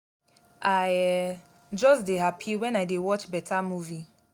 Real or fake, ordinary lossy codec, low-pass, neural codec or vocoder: real; none; 19.8 kHz; none